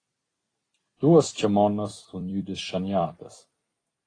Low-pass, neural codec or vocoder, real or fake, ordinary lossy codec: 9.9 kHz; vocoder, 44.1 kHz, 128 mel bands every 256 samples, BigVGAN v2; fake; AAC, 32 kbps